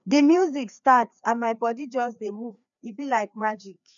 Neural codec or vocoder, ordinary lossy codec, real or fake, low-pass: codec, 16 kHz, 2 kbps, FreqCodec, larger model; none; fake; 7.2 kHz